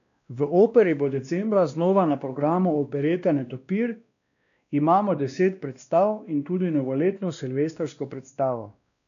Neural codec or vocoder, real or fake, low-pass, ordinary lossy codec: codec, 16 kHz, 1 kbps, X-Codec, WavLM features, trained on Multilingual LibriSpeech; fake; 7.2 kHz; MP3, 96 kbps